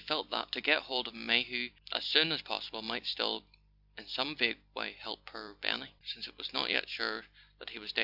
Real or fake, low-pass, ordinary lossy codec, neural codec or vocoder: fake; 5.4 kHz; AAC, 48 kbps; vocoder, 44.1 kHz, 128 mel bands every 256 samples, BigVGAN v2